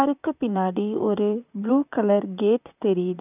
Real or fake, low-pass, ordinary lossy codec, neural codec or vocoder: fake; 3.6 kHz; none; vocoder, 44.1 kHz, 128 mel bands, Pupu-Vocoder